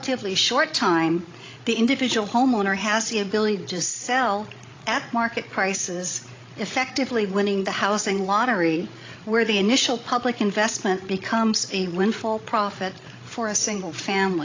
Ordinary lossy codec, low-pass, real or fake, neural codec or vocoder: AAC, 32 kbps; 7.2 kHz; fake; codec, 16 kHz, 16 kbps, FreqCodec, larger model